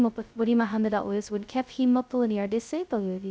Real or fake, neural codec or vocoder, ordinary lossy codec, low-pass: fake; codec, 16 kHz, 0.2 kbps, FocalCodec; none; none